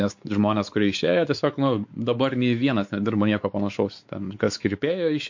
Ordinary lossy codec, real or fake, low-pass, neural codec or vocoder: MP3, 48 kbps; fake; 7.2 kHz; codec, 16 kHz, 4 kbps, X-Codec, WavLM features, trained on Multilingual LibriSpeech